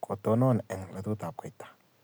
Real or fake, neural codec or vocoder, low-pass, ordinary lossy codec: real; none; none; none